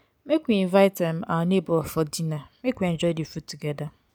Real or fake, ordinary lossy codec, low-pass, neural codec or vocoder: real; none; none; none